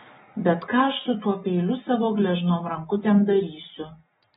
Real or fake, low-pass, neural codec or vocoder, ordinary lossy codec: real; 7.2 kHz; none; AAC, 16 kbps